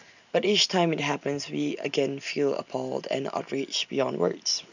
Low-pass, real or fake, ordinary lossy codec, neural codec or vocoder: 7.2 kHz; real; none; none